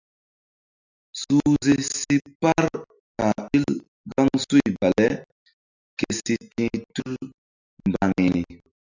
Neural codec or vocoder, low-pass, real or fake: none; 7.2 kHz; real